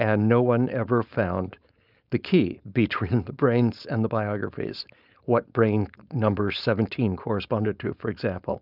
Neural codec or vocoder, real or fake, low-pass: codec, 16 kHz, 4.8 kbps, FACodec; fake; 5.4 kHz